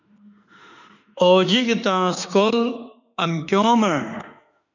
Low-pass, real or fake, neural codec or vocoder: 7.2 kHz; fake; autoencoder, 48 kHz, 32 numbers a frame, DAC-VAE, trained on Japanese speech